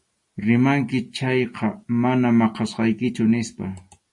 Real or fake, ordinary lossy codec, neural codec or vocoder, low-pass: real; MP3, 48 kbps; none; 10.8 kHz